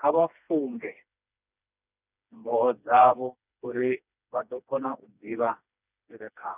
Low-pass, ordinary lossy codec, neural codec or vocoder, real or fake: 3.6 kHz; none; codec, 16 kHz, 2 kbps, FreqCodec, smaller model; fake